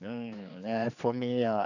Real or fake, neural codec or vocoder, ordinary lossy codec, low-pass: fake; codec, 16 kHz, 2 kbps, X-Codec, HuBERT features, trained on general audio; none; 7.2 kHz